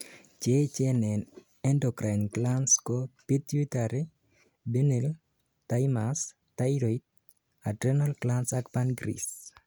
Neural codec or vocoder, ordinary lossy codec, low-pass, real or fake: none; none; none; real